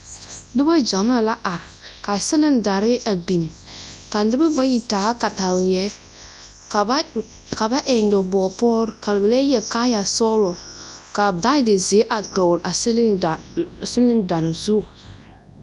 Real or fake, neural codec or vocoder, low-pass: fake; codec, 24 kHz, 0.9 kbps, WavTokenizer, large speech release; 10.8 kHz